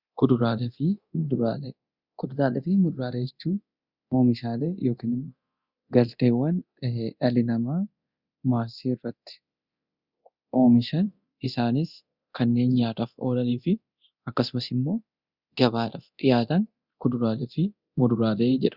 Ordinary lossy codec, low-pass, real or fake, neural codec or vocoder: Opus, 64 kbps; 5.4 kHz; fake; codec, 24 kHz, 0.9 kbps, DualCodec